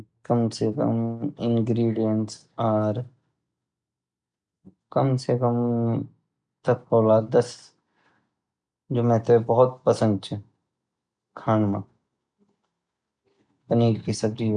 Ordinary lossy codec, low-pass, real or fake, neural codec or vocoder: none; 9.9 kHz; real; none